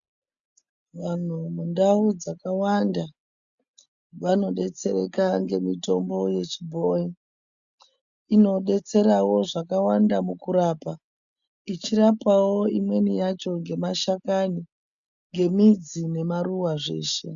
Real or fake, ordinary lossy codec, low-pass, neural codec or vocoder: real; MP3, 96 kbps; 7.2 kHz; none